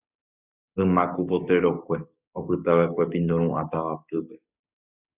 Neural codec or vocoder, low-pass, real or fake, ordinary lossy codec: codec, 16 kHz, 6 kbps, DAC; 3.6 kHz; fake; Opus, 64 kbps